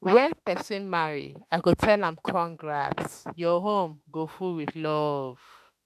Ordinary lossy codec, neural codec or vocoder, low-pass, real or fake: none; autoencoder, 48 kHz, 32 numbers a frame, DAC-VAE, trained on Japanese speech; 14.4 kHz; fake